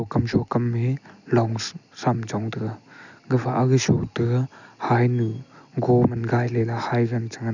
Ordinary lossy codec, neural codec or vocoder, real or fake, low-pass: none; none; real; 7.2 kHz